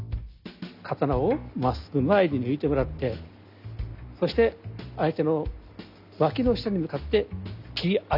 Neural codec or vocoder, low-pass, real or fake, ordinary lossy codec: none; 5.4 kHz; real; none